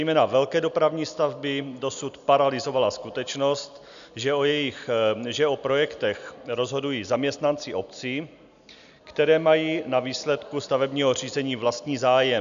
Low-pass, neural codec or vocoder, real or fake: 7.2 kHz; none; real